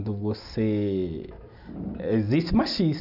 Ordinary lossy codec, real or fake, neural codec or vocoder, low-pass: none; real; none; 5.4 kHz